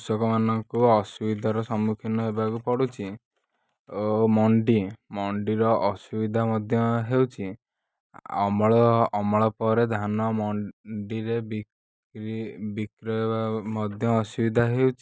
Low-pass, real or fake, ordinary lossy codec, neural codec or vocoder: none; real; none; none